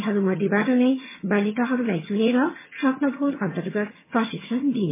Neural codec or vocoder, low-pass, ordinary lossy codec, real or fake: vocoder, 22.05 kHz, 80 mel bands, HiFi-GAN; 3.6 kHz; MP3, 16 kbps; fake